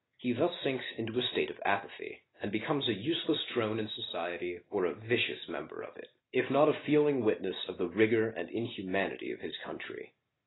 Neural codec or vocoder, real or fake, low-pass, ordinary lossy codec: none; real; 7.2 kHz; AAC, 16 kbps